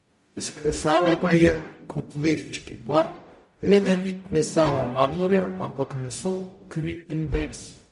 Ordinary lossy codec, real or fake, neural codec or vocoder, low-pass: MP3, 48 kbps; fake; codec, 44.1 kHz, 0.9 kbps, DAC; 14.4 kHz